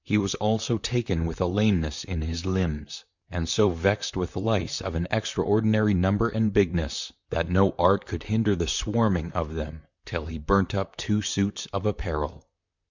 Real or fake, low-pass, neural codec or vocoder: fake; 7.2 kHz; vocoder, 44.1 kHz, 128 mel bands, Pupu-Vocoder